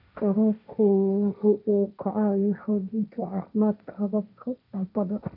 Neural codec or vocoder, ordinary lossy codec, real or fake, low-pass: codec, 16 kHz, 1.1 kbps, Voila-Tokenizer; MP3, 48 kbps; fake; 5.4 kHz